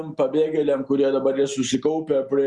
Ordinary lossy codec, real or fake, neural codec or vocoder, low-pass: Opus, 64 kbps; real; none; 10.8 kHz